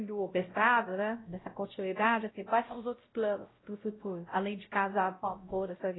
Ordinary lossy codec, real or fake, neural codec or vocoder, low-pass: AAC, 16 kbps; fake; codec, 16 kHz, 0.5 kbps, X-Codec, WavLM features, trained on Multilingual LibriSpeech; 7.2 kHz